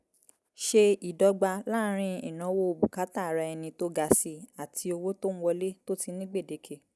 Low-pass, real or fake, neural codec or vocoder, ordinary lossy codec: none; real; none; none